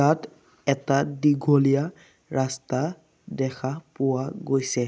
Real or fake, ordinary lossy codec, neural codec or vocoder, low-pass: real; none; none; none